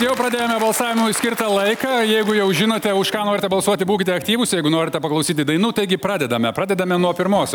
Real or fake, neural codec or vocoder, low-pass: real; none; 19.8 kHz